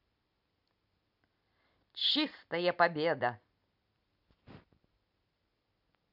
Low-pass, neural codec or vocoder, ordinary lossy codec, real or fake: 5.4 kHz; vocoder, 44.1 kHz, 128 mel bands every 256 samples, BigVGAN v2; none; fake